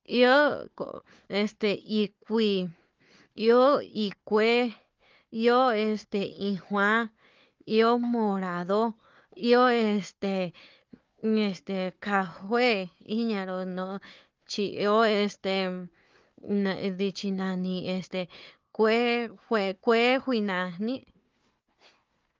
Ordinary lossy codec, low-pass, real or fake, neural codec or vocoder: Opus, 24 kbps; 7.2 kHz; fake; codec, 16 kHz, 4 kbps, FunCodec, trained on Chinese and English, 50 frames a second